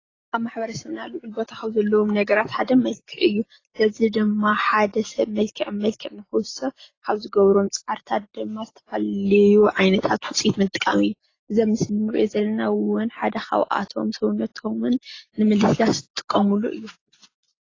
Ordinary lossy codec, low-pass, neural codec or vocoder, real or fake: AAC, 32 kbps; 7.2 kHz; none; real